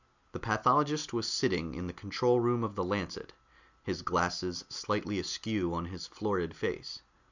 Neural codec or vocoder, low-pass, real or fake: none; 7.2 kHz; real